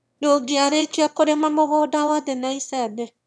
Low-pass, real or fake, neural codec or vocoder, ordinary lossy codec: none; fake; autoencoder, 22.05 kHz, a latent of 192 numbers a frame, VITS, trained on one speaker; none